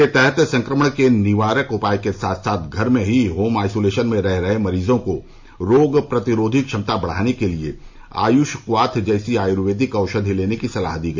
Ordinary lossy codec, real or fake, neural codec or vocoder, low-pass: MP3, 48 kbps; real; none; 7.2 kHz